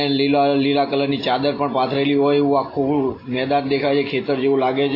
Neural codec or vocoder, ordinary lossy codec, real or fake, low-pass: none; AAC, 24 kbps; real; 5.4 kHz